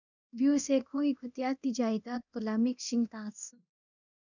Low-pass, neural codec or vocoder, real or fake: 7.2 kHz; codec, 24 kHz, 0.9 kbps, DualCodec; fake